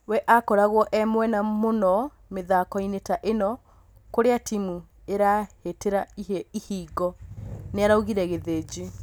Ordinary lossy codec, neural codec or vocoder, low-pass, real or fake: none; none; none; real